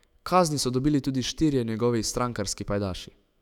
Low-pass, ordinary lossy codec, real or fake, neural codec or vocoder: 19.8 kHz; none; fake; autoencoder, 48 kHz, 128 numbers a frame, DAC-VAE, trained on Japanese speech